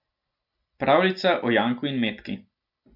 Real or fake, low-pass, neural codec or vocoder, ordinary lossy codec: real; 5.4 kHz; none; none